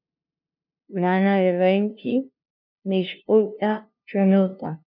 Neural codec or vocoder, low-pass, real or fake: codec, 16 kHz, 0.5 kbps, FunCodec, trained on LibriTTS, 25 frames a second; 5.4 kHz; fake